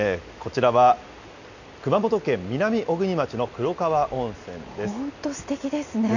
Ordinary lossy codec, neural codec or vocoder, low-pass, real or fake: none; none; 7.2 kHz; real